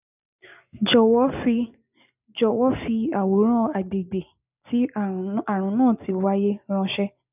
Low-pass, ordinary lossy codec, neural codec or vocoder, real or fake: 3.6 kHz; none; none; real